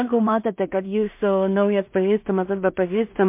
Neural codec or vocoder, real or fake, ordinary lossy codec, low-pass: codec, 16 kHz in and 24 kHz out, 0.4 kbps, LongCat-Audio-Codec, two codebook decoder; fake; MP3, 32 kbps; 3.6 kHz